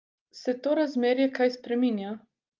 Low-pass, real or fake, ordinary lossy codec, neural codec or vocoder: 7.2 kHz; real; Opus, 24 kbps; none